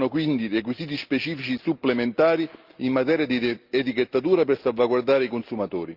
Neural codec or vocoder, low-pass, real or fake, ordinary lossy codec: none; 5.4 kHz; real; Opus, 32 kbps